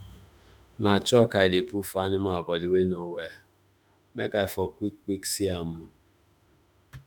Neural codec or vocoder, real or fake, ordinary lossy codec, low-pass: autoencoder, 48 kHz, 32 numbers a frame, DAC-VAE, trained on Japanese speech; fake; none; none